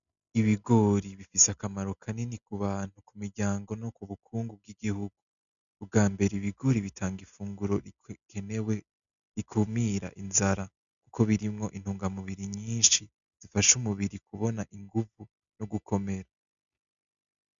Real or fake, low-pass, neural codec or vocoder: real; 7.2 kHz; none